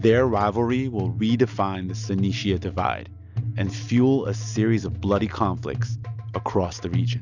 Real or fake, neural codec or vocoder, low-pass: real; none; 7.2 kHz